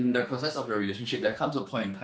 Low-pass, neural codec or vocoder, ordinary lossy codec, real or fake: none; codec, 16 kHz, 2 kbps, X-Codec, HuBERT features, trained on general audio; none; fake